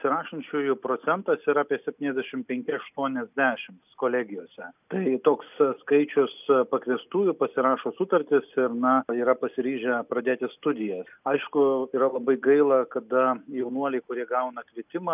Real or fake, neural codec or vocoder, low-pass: real; none; 3.6 kHz